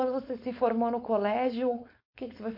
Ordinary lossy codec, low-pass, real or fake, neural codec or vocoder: MP3, 32 kbps; 5.4 kHz; fake; codec, 16 kHz, 4.8 kbps, FACodec